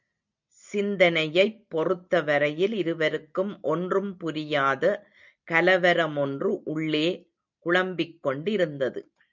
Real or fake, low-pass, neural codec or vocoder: real; 7.2 kHz; none